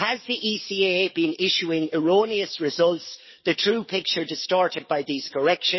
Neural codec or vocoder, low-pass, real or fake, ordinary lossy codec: codec, 44.1 kHz, 7.8 kbps, DAC; 7.2 kHz; fake; MP3, 24 kbps